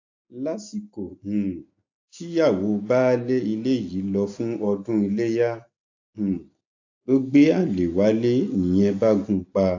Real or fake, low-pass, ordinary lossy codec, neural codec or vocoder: real; 7.2 kHz; AAC, 48 kbps; none